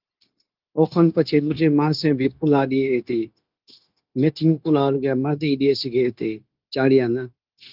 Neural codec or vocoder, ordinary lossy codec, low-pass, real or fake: codec, 16 kHz, 0.9 kbps, LongCat-Audio-Codec; Opus, 24 kbps; 5.4 kHz; fake